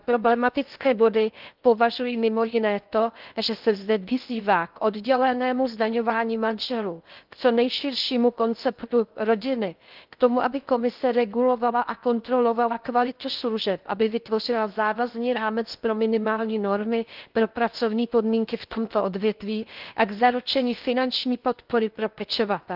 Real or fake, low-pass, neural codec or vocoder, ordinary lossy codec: fake; 5.4 kHz; codec, 16 kHz in and 24 kHz out, 0.6 kbps, FocalCodec, streaming, 2048 codes; Opus, 32 kbps